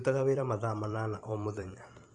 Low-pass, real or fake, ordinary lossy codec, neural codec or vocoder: 10.8 kHz; fake; Opus, 32 kbps; vocoder, 44.1 kHz, 128 mel bands every 512 samples, BigVGAN v2